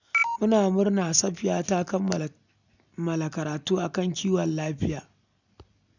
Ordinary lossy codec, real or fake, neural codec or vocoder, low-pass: none; real; none; 7.2 kHz